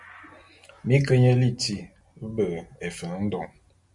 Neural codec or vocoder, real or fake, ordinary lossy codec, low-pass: none; real; MP3, 64 kbps; 10.8 kHz